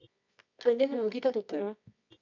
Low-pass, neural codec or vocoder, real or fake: 7.2 kHz; codec, 24 kHz, 0.9 kbps, WavTokenizer, medium music audio release; fake